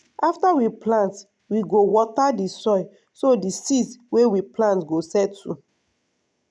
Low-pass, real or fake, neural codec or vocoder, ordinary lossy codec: none; real; none; none